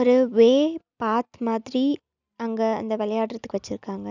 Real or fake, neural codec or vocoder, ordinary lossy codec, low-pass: real; none; none; 7.2 kHz